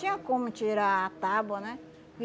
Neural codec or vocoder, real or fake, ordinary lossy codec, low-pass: none; real; none; none